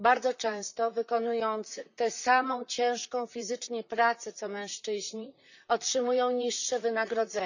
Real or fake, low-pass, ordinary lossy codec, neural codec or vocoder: fake; 7.2 kHz; none; vocoder, 44.1 kHz, 128 mel bands, Pupu-Vocoder